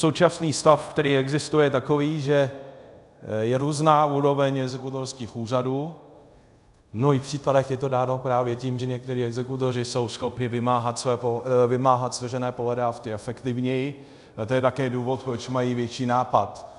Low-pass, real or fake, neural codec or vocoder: 10.8 kHz; fake; codec, 24 kHz, 0.5 kbps, DualCodec